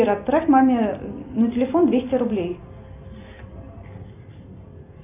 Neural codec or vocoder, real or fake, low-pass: none; real; 3.6 kHz